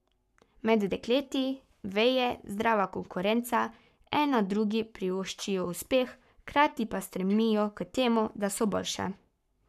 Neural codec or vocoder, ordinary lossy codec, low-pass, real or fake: codec, 44.1 kHz, 7.8 kbps, Pupu-Codec; none; 14.4 kHz; fake